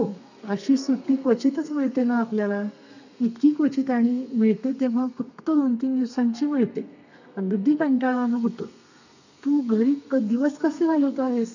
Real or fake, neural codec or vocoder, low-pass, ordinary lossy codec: fake; codec, 32 kHz, 1.9 kbps, SNAC; 7.2 kHz; none